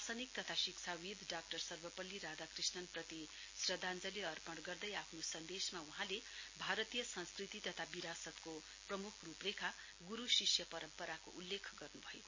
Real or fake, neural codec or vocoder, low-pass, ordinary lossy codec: real; none; 7.2 kHz; MP3, 32 kbps